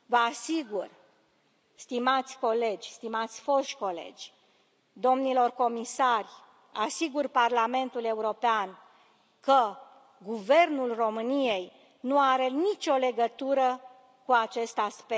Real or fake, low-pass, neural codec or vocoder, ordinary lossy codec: real; none; none; none